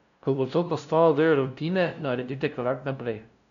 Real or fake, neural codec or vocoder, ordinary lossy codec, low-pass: fake; codec, 16 kHz, 0.5 kbps, FunCodec, trained on LibriTTS, 25 frames a second; none; 7.2 kHz